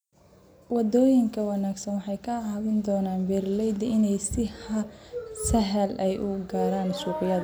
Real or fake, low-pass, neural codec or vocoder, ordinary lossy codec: real; none; none; none